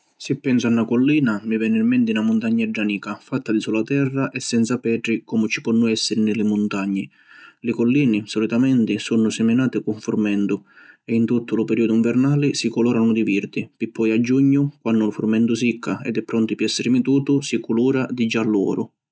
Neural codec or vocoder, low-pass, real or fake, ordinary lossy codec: none; none; real; none